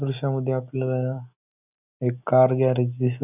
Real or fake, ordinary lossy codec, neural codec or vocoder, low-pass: real; none; none; 3.6 kHz